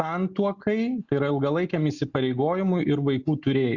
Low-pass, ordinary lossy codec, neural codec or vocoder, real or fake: 7.2 kHz; Opus, 64 kbps; none; real